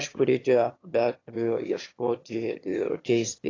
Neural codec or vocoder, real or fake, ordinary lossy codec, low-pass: autoencoder, 22.05 kHz, a latent of 192 numbers a frame, VITS, trained on one speaker; fake; AAC, 32 kbps; 7.2 kHz